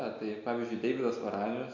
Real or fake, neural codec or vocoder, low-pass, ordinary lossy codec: real; none; 7.2 kHz; MP3, 48 kbps